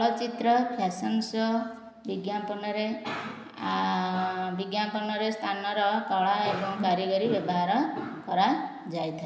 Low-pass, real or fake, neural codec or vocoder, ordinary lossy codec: none; real; none; none